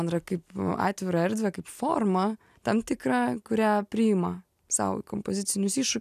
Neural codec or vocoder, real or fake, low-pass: none; real; 14.4 kHz